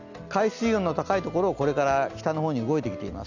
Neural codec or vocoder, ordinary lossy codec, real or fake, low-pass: none; Opus, 64 kbps; real; 7.2 kHz